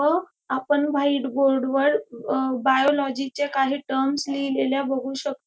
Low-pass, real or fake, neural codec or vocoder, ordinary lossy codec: none; real; none; none